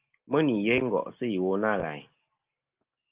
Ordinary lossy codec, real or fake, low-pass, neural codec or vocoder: Opus, 24 kbps; real; 3.6 kHz; none